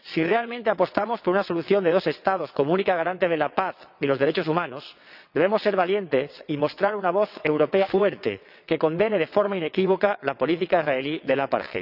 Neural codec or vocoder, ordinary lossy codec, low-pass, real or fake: vocoder, 22.05 kHz, 80 mel bands, WaveNeXt; none; 5.4 kHz; fake